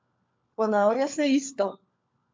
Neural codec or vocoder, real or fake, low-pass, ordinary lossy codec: codec, 16 kHz, 4 kbps, FunCodec, trained on LibriTTS, 50 frames a second; fake; 7.2 kHz; MP3, 48 kbps